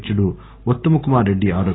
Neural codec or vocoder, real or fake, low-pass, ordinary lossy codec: none; real; 7.2 kHz; AAC, 16 kbps